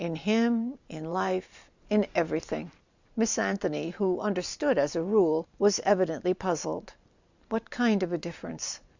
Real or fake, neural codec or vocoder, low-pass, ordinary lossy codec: fake; vocoder, 44.1 kHz, 128 mel bands every 512 samples, BigVGAN v2; 7.2 kHz; Opus, 64 kbps